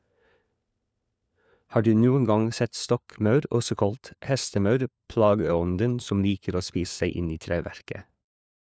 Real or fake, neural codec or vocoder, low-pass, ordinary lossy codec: fake; codec, 16 kHz, 4 kbps, FunCodec, trained on LibriTTS, 50 frames a second; none; none